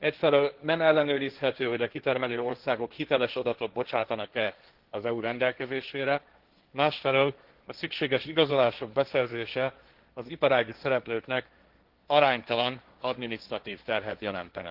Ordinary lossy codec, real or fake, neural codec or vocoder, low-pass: Opus, 16 kbps; fake; codec, 16 kHz, 1.1 kbps, Voila-Tokenizer; 5.4 kHz